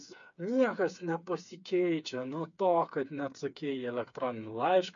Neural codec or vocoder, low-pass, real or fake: codec, 16 kHz, 4 kbps, FreqCodec, smaller model; 7.2 kHz; fake